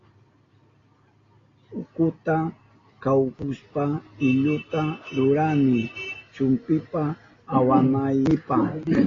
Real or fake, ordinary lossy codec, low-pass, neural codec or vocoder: real; AAC, 32 kbps; 7.2 kHz; none